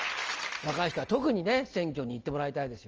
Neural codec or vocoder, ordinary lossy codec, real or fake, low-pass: none; Opus, 24 kbps; real; 7.2 kHz